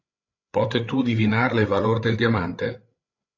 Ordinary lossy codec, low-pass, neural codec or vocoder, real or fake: AAC, 48 kbps; 7.2 kHz; codec, 16 kHz, 8 kbps, FreqCodec, larger model; fake